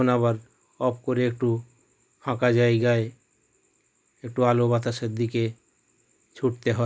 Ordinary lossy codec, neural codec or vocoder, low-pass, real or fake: none; none; none; real